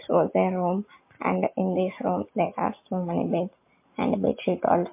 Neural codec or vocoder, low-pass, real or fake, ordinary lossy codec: none; 3.6 kHz; real; MP3, 24 kbps